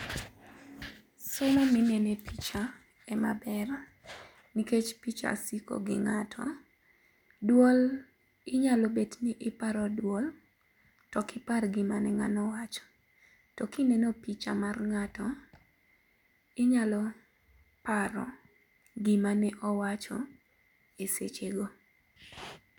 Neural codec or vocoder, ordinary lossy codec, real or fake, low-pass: none; MP3, 96 kbps; real; 19.8 kHz